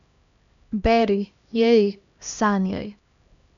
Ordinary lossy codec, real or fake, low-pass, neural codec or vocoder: none; fake; 7.2 kHz; codec, 16 kHz, 1 kbps, X-Codec, HuBERT features, trained on LibriSpeech